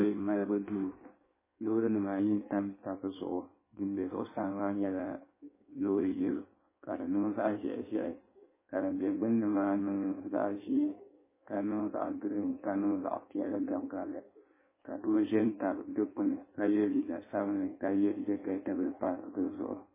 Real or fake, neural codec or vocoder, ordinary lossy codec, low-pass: fake; codec, 16 kHz in and 24 kHz out, 1.1 kbps, FireRedTTS-2 codec; MP3, 16 kbps; 3.6 kHz